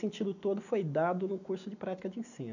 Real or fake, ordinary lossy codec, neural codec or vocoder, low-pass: real; none; none; 7.2 kHz